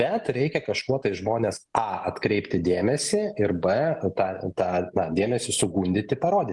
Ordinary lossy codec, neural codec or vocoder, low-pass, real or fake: Opus, 24 kbps; none; 10.8 kHz; real